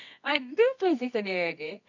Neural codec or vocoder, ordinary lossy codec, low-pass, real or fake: codec, 24 kHz, 0.9 kbps, WavTokenizer, medium music audio release; none; 7.2 kHz; fake